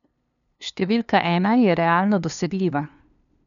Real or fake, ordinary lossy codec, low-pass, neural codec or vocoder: fake; none; 7.2 kHz; codec, 16 kHz, 2 kbps, FunCodec, trained on LibriTTS, 25 frames a second